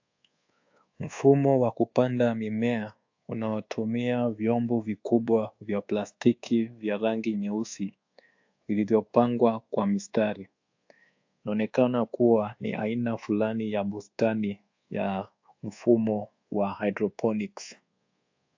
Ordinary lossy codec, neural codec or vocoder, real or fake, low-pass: AAC, 48 kbps; codec, 24 kHz, 1.2 kbps, DualCodec; fake; 7.2 kHz